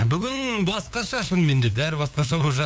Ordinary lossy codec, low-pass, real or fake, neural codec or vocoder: none; none; fake; codec, 16 kHz, 2 kbps, FunCodec, trained on LibriTTS, 25 frames a second